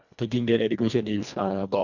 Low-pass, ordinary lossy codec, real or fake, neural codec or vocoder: 7.2 kHz; none; fake; codec, 24 kHz, 1.5 kbps, HILCodec